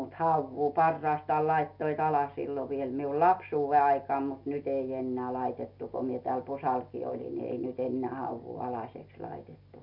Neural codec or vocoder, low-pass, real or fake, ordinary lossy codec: none; 5.4 kHz; real; none